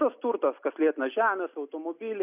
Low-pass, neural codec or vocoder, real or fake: 3.6 kHz; none; real